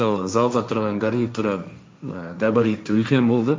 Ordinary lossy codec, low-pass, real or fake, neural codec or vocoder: none; none; fake; codec, 16 kHz, 1.1 kbps, Voila-Tokenizer